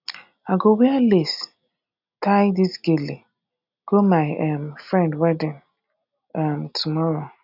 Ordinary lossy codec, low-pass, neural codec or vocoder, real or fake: none; 5.4 kHz; none; real